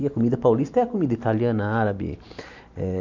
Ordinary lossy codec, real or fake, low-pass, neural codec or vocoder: none; real; 7.2 kHz; none